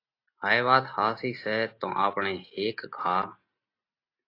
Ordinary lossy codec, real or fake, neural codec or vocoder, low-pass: AAC, 32 kbps; real; none; 5.4 kHz